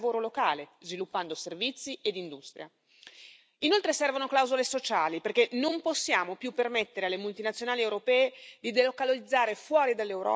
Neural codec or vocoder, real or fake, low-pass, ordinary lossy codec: none; real; none; none